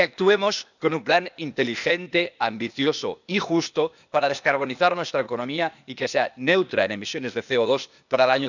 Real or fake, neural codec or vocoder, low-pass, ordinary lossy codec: fake; codec, 16 kHz, 0.8 kbps, ZipCodec; 7.2 kHz; none